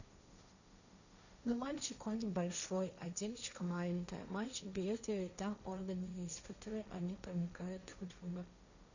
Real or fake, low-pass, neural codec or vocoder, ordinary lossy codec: fake; 7.2 kHz; codec, 16 kHz, 1.1 kbps, Voila-Tokenizer; none